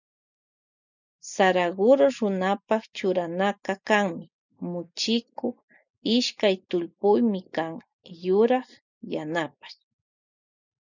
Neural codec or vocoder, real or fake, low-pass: none; real; 7.2 kHz